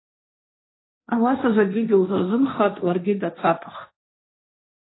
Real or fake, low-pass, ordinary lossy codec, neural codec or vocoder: fake; 7.2 kHz; AAC, 16 kbps; codec, 16 kHz, 1.1 kbps, Voila-Tokenizer